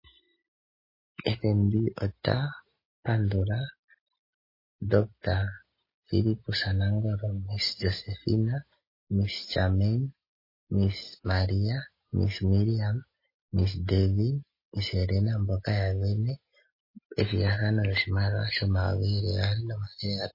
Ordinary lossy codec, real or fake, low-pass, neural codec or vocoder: MP3, 24 kbps; real; 5.4 kHz; none